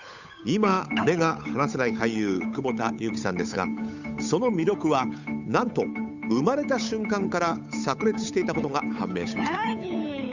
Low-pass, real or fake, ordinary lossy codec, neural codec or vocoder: 7.2 kHz; fake; none; codec, 16 kHz, 8 kbps, FunCodec, trained on Chinese and English, 25 frames a second